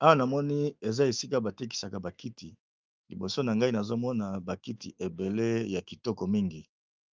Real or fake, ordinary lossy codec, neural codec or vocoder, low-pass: fake; Opus, 24 kbps; autoencoder, 48 kHz, 128 numbers a frame, DAC-VAE, trained on Japanese speech; 7.2 kHz